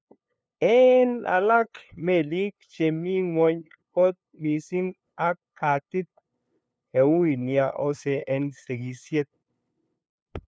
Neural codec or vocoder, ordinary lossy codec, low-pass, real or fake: codec, 16 kHz, 2 kbps, FunCodec, trained on LibriTTS, 25 frames a second; none; none; fake